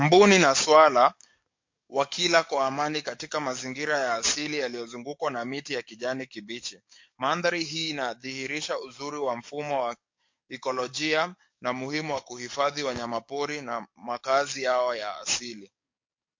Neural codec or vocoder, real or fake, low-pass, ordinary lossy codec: codec, 44.1 kHz, 7.8 kbps, DAC; fake; 7.2 kHz; MP3, 48 kbps